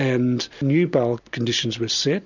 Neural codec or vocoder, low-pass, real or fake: none; 7.2 kHz; real